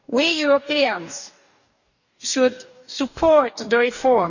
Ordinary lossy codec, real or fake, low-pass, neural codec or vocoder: none; fake; 7.2 kHz; codec, 44.1 kHz, 2.6 kbps, DAC